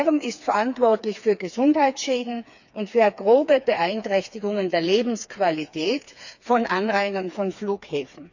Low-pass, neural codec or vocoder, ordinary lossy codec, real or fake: 7.2 kHz; codec, 16 kHz, 4 kbps, FreqCodec, smaller model; none; fake